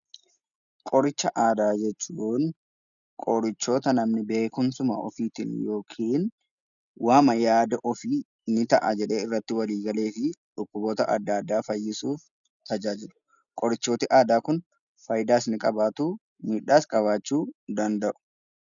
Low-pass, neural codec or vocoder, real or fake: 7.2 kHz; none; real